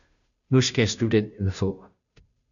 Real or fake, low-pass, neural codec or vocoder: fake; 7.2 kHz; codec, 16 kHz, 0.5 kbps, FunCodec, trained on Chinese and English, 25 frames a second